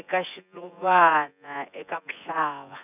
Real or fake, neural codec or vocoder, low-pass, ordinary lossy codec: fake; vocoder, 24 kHz, 100 mel bands, Vocos; 3.6 kHz; none